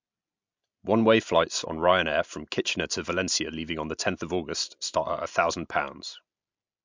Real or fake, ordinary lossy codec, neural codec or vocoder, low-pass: real; MP3, 64 kbps; none; 7.2 kHz